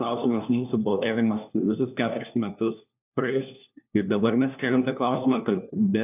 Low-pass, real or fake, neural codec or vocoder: 3.6 kHz; fake; codec, 16 kHz, 1 kbps, FunCodec, trained on LibriTTS, 50 frames a second